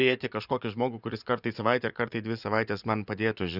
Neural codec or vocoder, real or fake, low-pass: vocoder, 24 kHz, 100 mel bands, Vocos; fake; 5.4 kHz